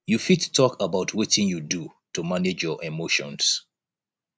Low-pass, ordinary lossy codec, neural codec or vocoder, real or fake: none; none; none; real